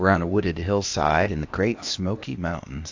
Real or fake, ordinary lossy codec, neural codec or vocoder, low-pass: fake; AAC, 48 kbps; codec, 16 kHz, 0.8 kbps, ZipCodec; 7.2 kHz